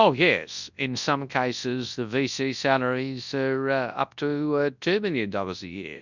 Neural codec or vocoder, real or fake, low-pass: codec, 24 kHz, 0.9 kbps, WavTokenizer, large speech release; fake; 7.2 kHz